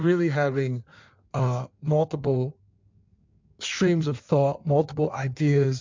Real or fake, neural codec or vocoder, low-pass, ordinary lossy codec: fake; codec, 16 kHz in and 24 kHz out, 1.1 kbps, FireRedTTS-2 codec; 7.2 kHz; MP3, 64 kbps